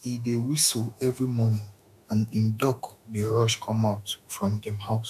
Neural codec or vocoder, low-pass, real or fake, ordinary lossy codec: autoencoder, 48 kHz, 32 numbers a frame, DAC-VAE, trained on Japanese speech; 14.4 kHz; fake; none